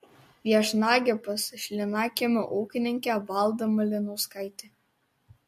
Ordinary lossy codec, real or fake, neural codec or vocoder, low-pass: MP3, 64 kbps; real; none; 14.4 kHz